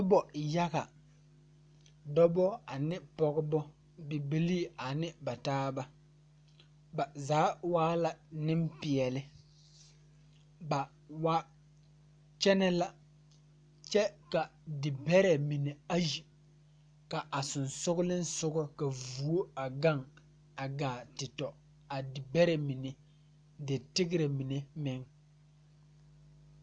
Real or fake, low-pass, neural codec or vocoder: real; 9.9 kHz; none